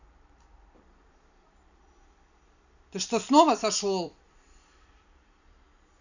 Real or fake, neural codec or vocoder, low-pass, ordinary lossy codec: fake; vocoder, 44.1 kHz, 128 mel bands every 256 samples, BigVGAN v2; 7.2 kHz; none